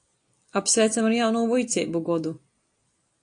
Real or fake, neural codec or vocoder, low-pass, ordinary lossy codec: real; none; 9.9 kHz; AAC, 48 kbps